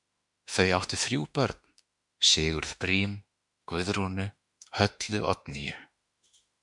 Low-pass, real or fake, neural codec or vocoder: 10.8 kHz; fake; autoencoder, 48 kHz, 32 numbers a frame, DAC-VAE, trained on Japanese speech